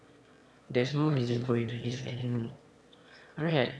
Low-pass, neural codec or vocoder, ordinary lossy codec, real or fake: none; autoencoder, 22.05 kHz, a latent of 192 numbers a frame, VITS, trained on one speaker; none; fake